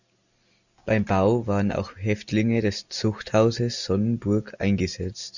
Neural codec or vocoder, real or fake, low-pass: none; real; 7.2 kHz